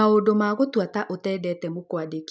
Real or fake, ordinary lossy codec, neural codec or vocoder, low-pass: real; none; none; none